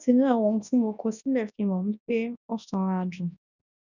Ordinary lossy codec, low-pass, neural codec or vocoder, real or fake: none; 7.2 kHz; codec, 24 kHz, 0.9 kbps, WavTokenizer, large speech release; fake